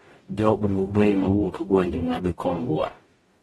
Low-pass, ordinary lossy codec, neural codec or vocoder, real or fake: 19.8 kHz; AAC, 32 kbps; codec, 44.1 kHz, 0.9 kbps, DAC; fake